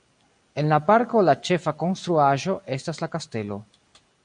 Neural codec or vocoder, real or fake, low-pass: none; real; 9.9 kHz